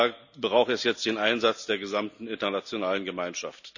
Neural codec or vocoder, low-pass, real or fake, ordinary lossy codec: none; 7.2 kHz; real; none